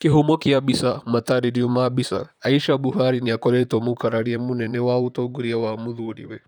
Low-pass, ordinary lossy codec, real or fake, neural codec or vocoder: 19.8 kHz; none; fake; vocoder, 44.1 kHz, 128 mel bands, Pupu-Vocoder